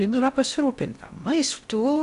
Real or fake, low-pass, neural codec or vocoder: fake; 10.8 kHz; codec, 16 kHz in and 24 kHz out, 0.6 kbps, FocalCodec, streaming, 2048 codes